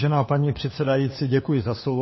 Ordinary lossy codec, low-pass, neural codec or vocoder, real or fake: MP3, 24 kbps; 7.2 kHz; codec, 16 kHz, 4 kbps, FunCodec, trained on LibriTTS, 50 frames a second; fake